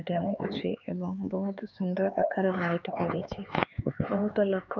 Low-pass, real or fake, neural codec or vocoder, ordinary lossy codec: 7.2 kHz; fake; codec, 16 kHz, 4 kbps, X-Codec, HuBERT features, trained on LibriSpeech; none